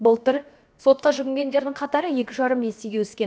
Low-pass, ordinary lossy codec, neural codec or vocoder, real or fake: none; none; codec, 16 kHz, 0.7 kbps, FocalCodec; fake